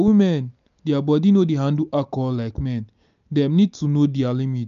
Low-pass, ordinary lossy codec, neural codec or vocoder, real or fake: 7.2 kHz; none; none; real